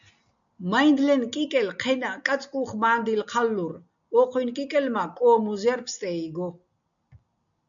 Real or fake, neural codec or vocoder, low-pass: real; none; 7.2 kHz